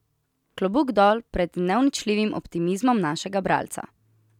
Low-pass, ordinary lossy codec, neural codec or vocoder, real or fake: 19.8 kHz; none; none; real